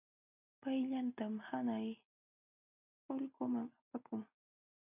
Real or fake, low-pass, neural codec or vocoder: real; 3.6 kHz; none